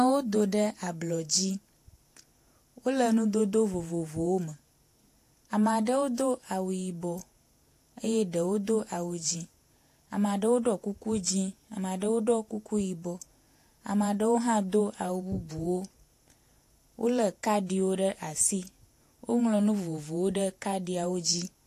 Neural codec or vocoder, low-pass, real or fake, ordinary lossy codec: vocoder, 48 kHz, 128 mel bands, Vocos; 14.4 kHz; fake; AAC, 64 kbps